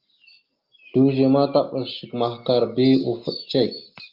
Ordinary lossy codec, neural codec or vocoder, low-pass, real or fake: Opus, 32 kbps; none; 5.4 kHz; real